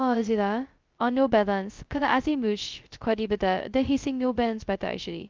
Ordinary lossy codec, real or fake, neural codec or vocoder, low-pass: Opus, 32 kbps; fake; codec, 16 kHz, 0.2 kbps, FocalCodec; 7.2 kHz